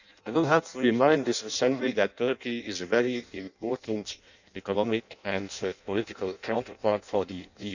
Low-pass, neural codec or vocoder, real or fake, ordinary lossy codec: 7.2 kHz; codec, 16 kHz in and 24 kHz out, 0.6 kbps, FireRedTTS-2 codec; fake; none